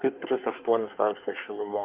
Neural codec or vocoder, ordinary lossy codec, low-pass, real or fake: codec, 24 kHz, 1 kbps, SNAC; Opus, 32 kbps; 3.6 kHz; fake